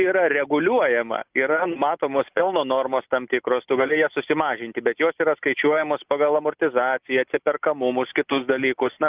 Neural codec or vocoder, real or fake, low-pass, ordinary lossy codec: none; real; 3.6 kHz; Opus, 32 kbps